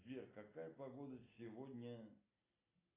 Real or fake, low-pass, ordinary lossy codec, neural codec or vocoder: real; 3.6 kHz; AAC, 16 kbps; none